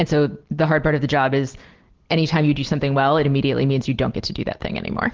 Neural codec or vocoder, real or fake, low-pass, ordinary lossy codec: none; real; 7.2 kHz; Opus, 16 kbps